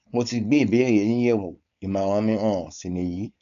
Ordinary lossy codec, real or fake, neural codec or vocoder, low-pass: none; fake; codec, 16 kHz, 4.8 kbps, FACodec; 7.2 kHz